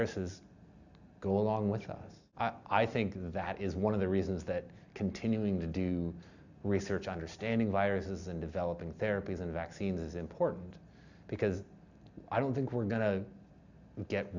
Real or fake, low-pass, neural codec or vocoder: real; 7.2 kHz; none